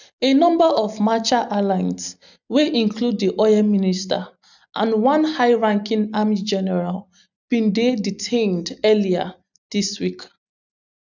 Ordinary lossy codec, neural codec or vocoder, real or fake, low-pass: none; none; real; 7.2 kHz